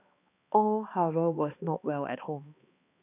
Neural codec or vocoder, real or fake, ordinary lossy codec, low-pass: codec, 16 kHz, 2 kbps, X-Codec, HuBERT features, trained on balanced general audio; fake; none; 3.6 kHz